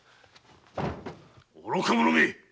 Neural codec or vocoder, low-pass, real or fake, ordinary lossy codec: none; none; real; none